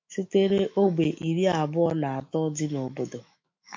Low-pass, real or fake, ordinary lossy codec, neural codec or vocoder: 7.2 kHz; fake; MP3, 48 kbps; autoencoder, 48 kHz, 128 numbers a frame, DAC-VAE, trained on Japanese speech